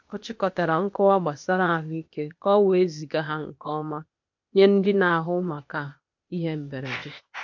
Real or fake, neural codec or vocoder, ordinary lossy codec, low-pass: fake; codec, 16 kHz, 0.8 kbps, ZipCodec; MP3, 48 kbps; 7.2 kHz